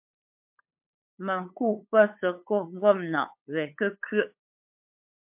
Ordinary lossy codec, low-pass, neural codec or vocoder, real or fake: MP3, 32 kbps; 3.6 kHz; codec, 16 kHz, 8 kbps, FunCodec, trained on LibriTTS, 25 frames a second; fake